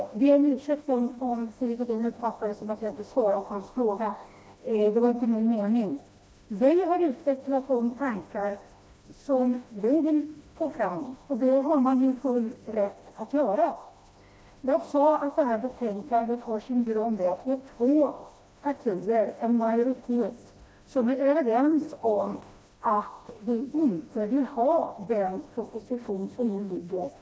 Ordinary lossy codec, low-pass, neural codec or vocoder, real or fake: none; none; codec, 16 kHz, 1 kbps, FreqCodec, smaller model; fake